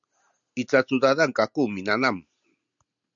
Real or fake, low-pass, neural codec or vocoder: real; 7.2 kHz; none